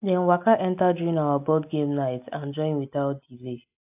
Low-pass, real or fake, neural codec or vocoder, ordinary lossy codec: 3.6 kHz; real; none; AAC, 32 kbps